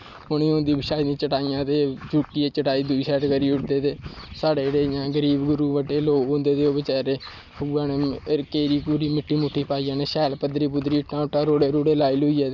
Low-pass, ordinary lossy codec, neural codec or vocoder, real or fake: 7.2 kHz; none; none; real